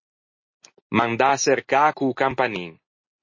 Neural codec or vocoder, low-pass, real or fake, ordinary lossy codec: none; 7.2 kHz; real; MP3, 32 kbps